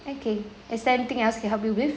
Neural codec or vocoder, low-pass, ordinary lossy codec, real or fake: none; none; none; real